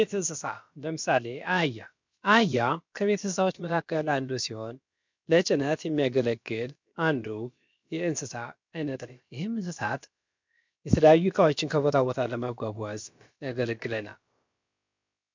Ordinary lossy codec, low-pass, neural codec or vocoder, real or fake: MP3, 64 kbps; 7.2 kHz; codec, 16 kHz, about 1 kbps, DyCAST, with the encoder's durations; fake